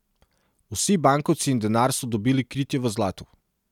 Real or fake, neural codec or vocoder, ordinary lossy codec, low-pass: real; none; none; 19.8 kHz